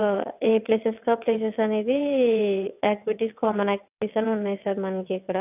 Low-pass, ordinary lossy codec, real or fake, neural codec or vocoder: 3.6 kHz; none; fake; vocoder, 22.05 kHz, 80 mel bands, WaveNeXt